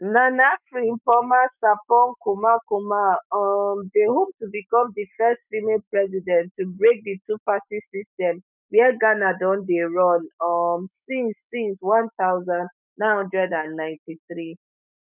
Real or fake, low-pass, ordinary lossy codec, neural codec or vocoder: fake; 3.6 kHz; none; autoencoder, 48 kHz, 128 numbers a frame, DAC-VAE, trained on Japanese speech